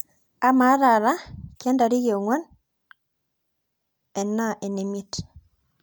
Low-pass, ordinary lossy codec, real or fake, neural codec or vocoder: none; none; real; none